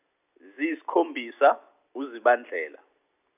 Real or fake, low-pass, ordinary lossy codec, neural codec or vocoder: real; 3.6 kHz; none; none